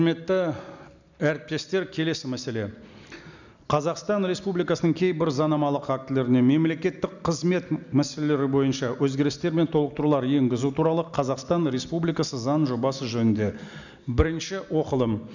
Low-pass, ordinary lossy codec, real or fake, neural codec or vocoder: 7.2 kHz; none; real; none